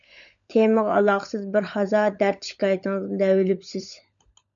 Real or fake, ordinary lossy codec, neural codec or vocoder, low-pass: fake; MP3, 96 kbps; codec, 16 kHz, 16 kbps, FunCodec, trained on LibriTTS, 50 frames a second; 7.2 kHz